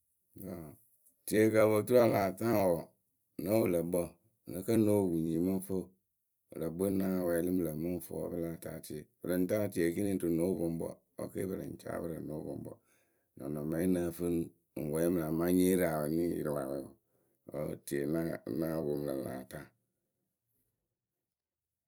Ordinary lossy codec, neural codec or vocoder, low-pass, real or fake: none; vocoder, 44.1 kHz, 128 mel bands every 256 samples, BigVGAN v2; none; fake